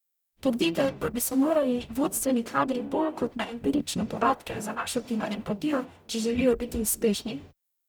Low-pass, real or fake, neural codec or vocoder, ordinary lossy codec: none; fake; codec, 44.1 kHz, 0.9 kbps, DAC; none